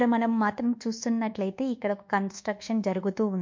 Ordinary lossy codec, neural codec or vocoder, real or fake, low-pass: MP3, 48 kbps; codec, 24 kHz, 1.2 kbps, DualCodec; fake; 7.2 kHz